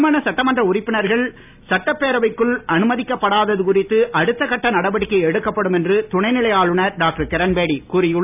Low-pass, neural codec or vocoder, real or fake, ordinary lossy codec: 3.6 kHz; none; real; none